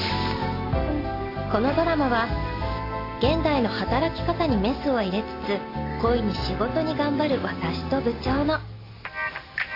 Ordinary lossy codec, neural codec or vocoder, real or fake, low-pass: AAC, 32 kbps; none; real; 5.4 kHz